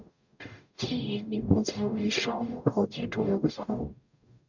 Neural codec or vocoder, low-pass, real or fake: codec, 44.1 kHz, 0.9 kbps, DAC; 7.2 kHz; fake